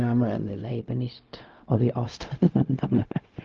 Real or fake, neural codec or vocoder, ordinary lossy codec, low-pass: fake; codec, 16 kHz, 0.4 kbps, LongCat-Audio-Codec; Opus, 32 kbps; 7.2 kHz